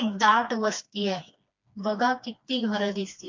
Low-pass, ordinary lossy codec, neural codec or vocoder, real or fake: 7.2 kHz; MP3, 48 kbps; codec, 16 kHz, 2 kbps, FreqCodec, smaller model; fake